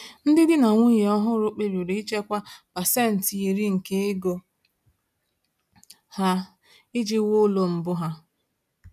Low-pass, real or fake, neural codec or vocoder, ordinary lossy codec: 14.4 kHz; real; none; none